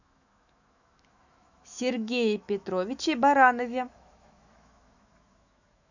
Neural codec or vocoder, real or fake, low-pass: autoencoder, 48 kHz, 128 numbers a frame, DAC-VAE, trained on Japanese speech; fake; 7.2 kHz